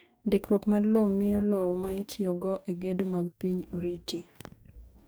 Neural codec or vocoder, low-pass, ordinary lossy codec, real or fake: codec, 44.1 kHz, 2.6 kbps, DAC; none; none; fake